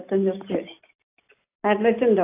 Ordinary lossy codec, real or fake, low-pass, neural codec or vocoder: AAC, 24 kbps; real; 3.6 kHz; none